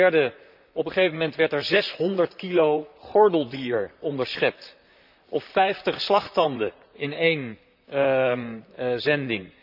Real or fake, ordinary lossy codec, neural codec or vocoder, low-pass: fake; none; vocoder, 44.1 kHz, 128 mel bands, Pupu-Vocoder; 5.4 kHz